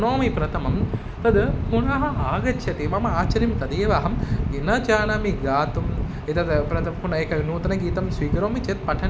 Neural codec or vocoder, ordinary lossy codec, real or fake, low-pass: none; none; real; none